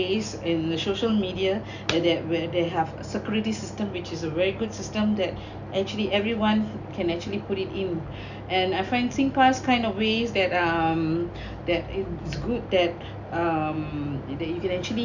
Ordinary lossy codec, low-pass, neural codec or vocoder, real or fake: none; 7.2 kHz; none; real